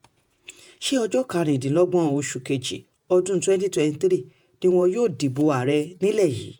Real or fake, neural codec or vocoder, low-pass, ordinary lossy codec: fake; vocoder, 48 kHz, 128 mel bands, Vocos; none; none